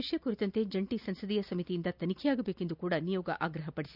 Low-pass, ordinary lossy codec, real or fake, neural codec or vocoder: 5.4 kHz; none; real; none